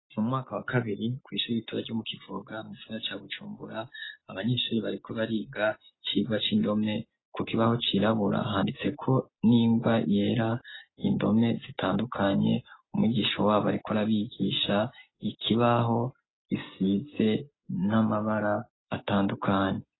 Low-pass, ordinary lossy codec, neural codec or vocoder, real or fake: 7.2 kHz; AAC, 16 kbps; none; real